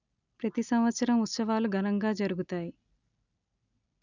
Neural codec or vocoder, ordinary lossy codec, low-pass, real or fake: none; none; 7.2 kHz; real